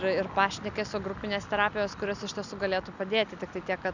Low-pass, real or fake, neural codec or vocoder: 7.2 kHz; real; none